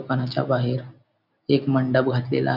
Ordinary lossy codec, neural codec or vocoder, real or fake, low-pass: none; none; real; 5.4 kHz